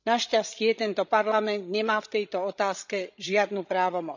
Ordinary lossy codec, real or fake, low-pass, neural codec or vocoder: none; fake; 7.2 kHz; codec, 16 kHz, 16 kbps, FreqCodec, larger model